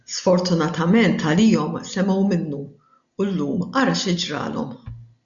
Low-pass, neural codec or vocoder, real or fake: 7.2 kHz; none; real